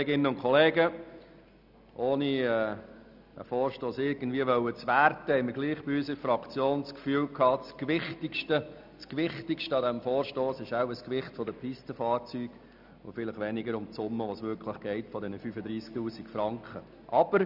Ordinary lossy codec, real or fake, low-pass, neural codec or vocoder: none; real; 5.4 kHz; none